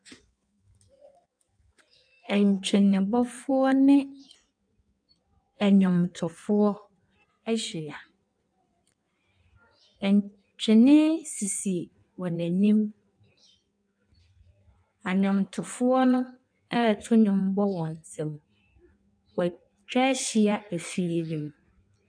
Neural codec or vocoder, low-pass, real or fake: codec, 16 kHz in and 24 kHz out, 1.1 kbps, FireRedTTS-2 codec; 9.9 kHz; fake